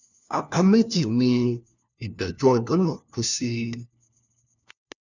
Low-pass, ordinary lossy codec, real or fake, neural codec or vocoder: 7.2 kHz; none; fake; codec, 16 kHz, 1 kbps, FunCodec, trained on LibriTTS, 50 frames a second